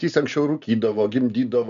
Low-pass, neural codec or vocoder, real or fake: 7.2 kHz; none; real